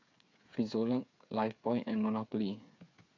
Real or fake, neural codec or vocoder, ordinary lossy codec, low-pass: fake; codec, 16 kHz, 8 kbps, FreqCodec, smaller model; AAC, 48 kbps; 7.2 kHz